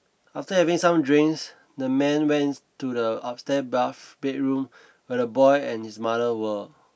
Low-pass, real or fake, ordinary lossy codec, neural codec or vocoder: none; real; none; none